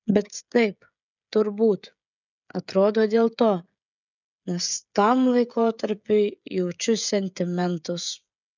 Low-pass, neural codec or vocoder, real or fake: 7.2 kHz; codec, 16 kHz, 16 kbps, FreqCodec, smaller model; fake